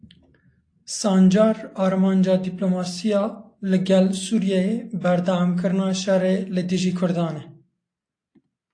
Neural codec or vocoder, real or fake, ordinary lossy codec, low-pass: none; real; AAC, 48 kbps; 9.9 kHz